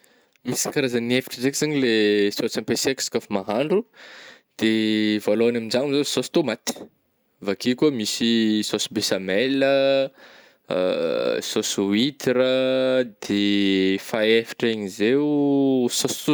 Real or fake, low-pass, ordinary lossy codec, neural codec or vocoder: real; none; none; none